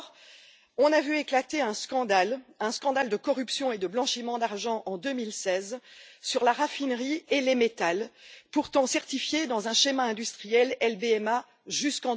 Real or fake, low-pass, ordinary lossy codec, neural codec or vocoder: real; none; none; none